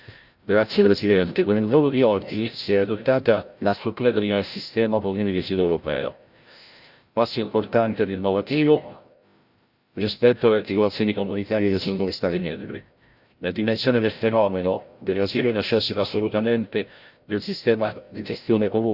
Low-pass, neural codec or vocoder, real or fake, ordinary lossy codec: 5.4 kHz; codec, 16 kHz, 0.5 kbps, FreqCodec, larger model; fake; Opus, 64 kbps